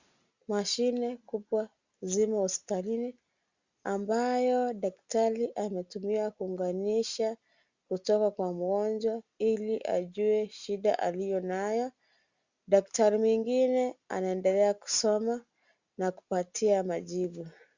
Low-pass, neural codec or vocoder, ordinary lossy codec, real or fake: 7.2 kHz; none; Opus, 64 kbps; real